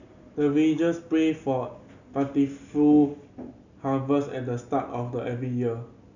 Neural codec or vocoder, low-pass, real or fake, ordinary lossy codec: none; 7.2 kHz; real; none